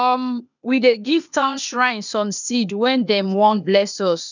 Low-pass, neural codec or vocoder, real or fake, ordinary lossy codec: 7.2 kHz; codec, 16 kHz, 0.8 kbps, ZipCodec; fake; none